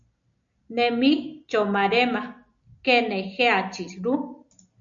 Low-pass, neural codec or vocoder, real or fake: 7.2 kHz; none; real